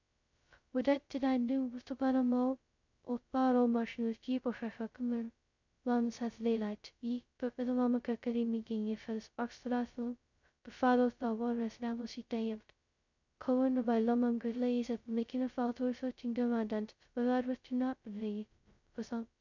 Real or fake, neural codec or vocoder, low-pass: fake; codec, 16 kHz, 0.2 kbps, FocalCodec; 7.2 kHz